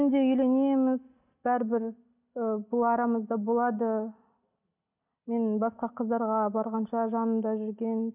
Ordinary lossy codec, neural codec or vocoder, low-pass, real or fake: none; none; 3.6 kHz; real